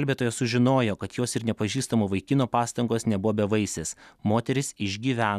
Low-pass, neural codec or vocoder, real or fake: 14.4 kHz; none; real